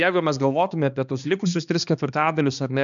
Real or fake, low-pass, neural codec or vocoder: fake; 7.2 kHz; codec, 16 kHz, 2 kbps, X-Codec, HuBERT features, trained on balanced general audio